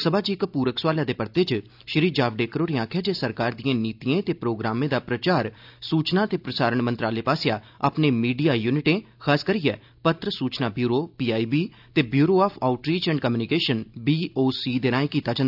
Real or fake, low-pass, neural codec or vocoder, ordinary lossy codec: real; 5.4 kHz; none; AAC, 48 kbps